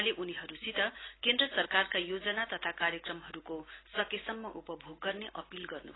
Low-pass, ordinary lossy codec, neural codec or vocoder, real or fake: 7.2 kHz; AAC, 16 kbps; none; real